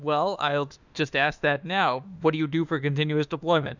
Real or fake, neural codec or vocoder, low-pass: fake; autoencoder, 48 kHz, 32 numbers a frame, DAC-VAE, trained on Japanese speech; 7.2 kHz